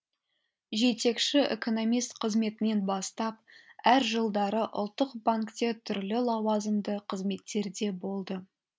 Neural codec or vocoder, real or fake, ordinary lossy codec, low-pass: none; real; none; none